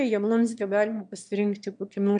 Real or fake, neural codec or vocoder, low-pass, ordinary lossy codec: fake; autoencoder, 22.05 kHz, a latent of 192 numbers a frame, VITS, trained on one speaker; 9.9 kHz; MP3, 64 kbps